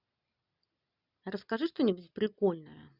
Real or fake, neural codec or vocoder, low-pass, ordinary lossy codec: real; none; 5.4 kHz; Opus, 64 kbps